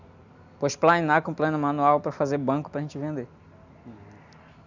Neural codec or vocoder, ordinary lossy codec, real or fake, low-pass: none; none; real; 7.2 kHz